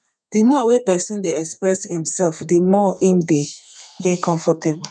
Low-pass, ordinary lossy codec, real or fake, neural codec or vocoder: 9.9 kHz; none; fake; codec, 32 kHz, 1.9 kbps, SNAC